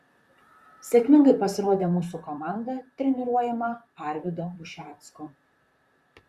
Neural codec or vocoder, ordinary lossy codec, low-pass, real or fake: vocoder, 44.1 kHz, 128 mel bands, Pupu-Vocoder; Opus, 64 kbps; 14.4 kHz; fake